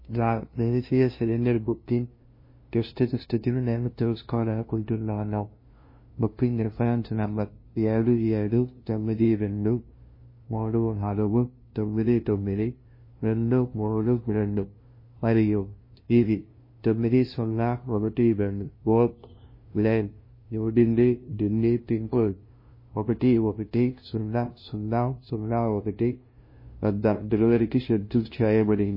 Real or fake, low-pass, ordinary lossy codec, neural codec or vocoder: fake; 5.4 kHz; MP3, 24 kbps; codec, 16 kHz, 0.5 kbps, FunCodec, trained on LibriTTS, 25 frames a second